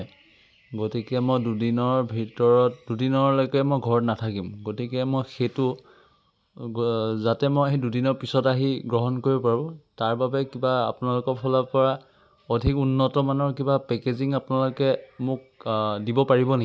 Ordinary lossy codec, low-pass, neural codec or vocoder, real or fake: none; none; none; real